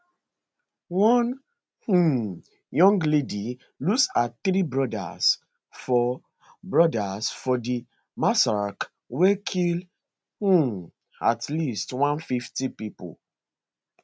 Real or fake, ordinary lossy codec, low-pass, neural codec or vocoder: real; none; none; none